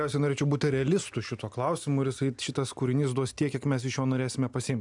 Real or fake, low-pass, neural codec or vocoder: real; 10.8 kHz; none